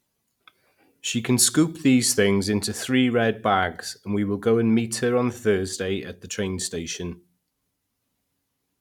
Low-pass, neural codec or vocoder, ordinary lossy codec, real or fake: 19.8 kHz; none; none; real